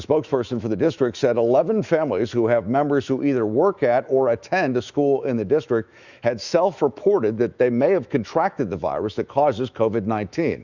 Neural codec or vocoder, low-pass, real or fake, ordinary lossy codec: autoencoder, 48 kHz, 128 numbers a frame, DAC-VAE, trained on Japanese speech; 7.2 kHz; fake; Opus, 64 kbps